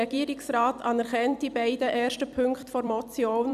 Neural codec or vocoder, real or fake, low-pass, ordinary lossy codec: vocoder, 44.1 kHz, 128 mel bands every 256 samples, BigVGAN v2; fake; 14.4 kHz; none